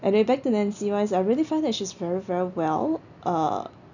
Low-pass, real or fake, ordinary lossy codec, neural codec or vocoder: 7.2 kHz; real; none; none